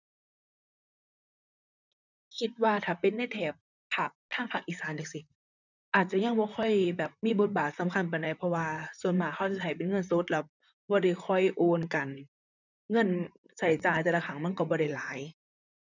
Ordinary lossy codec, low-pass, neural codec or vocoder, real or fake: none; 7.2 kHz; vocoder, 44.1 kHz, 128 mel bands, Pupu-Vocoder; fake